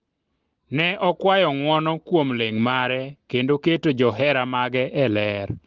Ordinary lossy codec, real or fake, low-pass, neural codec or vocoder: Opus, 16 kbps; real; 7.2 kHz; none